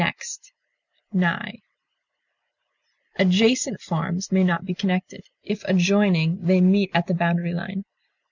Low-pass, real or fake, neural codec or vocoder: 7.2 kHz; real; none